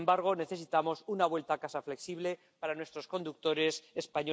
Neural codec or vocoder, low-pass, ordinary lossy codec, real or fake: none; none; none; real